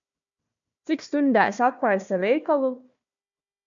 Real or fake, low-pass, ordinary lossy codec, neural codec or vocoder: fake; 7.2 kHz; MP3, 64 kbps; codec, 16 kHz, 1 kbps, FunCodec, trained on Chinese and English, 50 frames a second